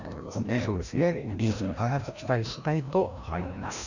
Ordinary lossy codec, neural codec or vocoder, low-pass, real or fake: none; codec, 16 kHz, 1 kbps, FreqCodec, larger model; 7.2 kHz; fake